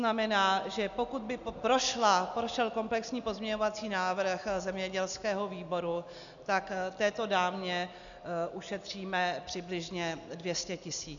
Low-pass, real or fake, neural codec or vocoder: 7.2 kHz; real; none